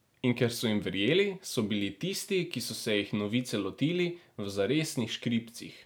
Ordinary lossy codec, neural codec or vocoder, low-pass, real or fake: none; none; none; real